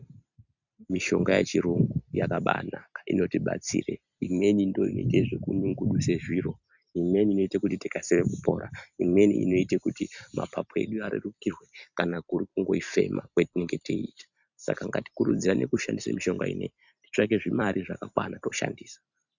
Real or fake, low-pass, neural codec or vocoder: fake; 7.2 kHz; vocoder, 44.1 kHz, 128 mel bands every 256 samples, BigVGAN v2